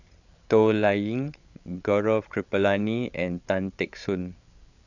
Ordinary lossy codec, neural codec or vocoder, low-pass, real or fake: none; codec, 16 kHz, 8 kbps, FreqCodec, larger model; 7.2 kHz; fake